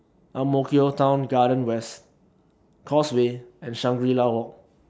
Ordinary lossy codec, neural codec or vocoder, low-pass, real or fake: none; none; none; real